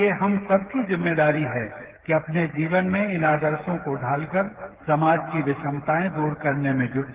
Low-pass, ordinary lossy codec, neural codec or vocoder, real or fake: 3.6 kHz; Opus, 16 kbps; codec, 16 kHz, 8 kbps, FreqCodec, smaller model; fake